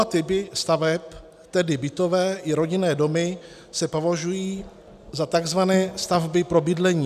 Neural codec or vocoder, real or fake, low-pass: none; real; 14.4 kHz